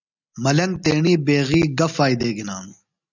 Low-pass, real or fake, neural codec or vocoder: 7.2 kHz; real; none